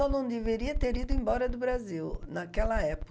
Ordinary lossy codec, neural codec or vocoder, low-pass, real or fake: none; none; none; real